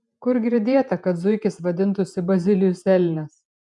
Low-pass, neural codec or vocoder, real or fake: 10.8 kHz; vocoder, 48 kHz, 128 mel bands, Vocos; fake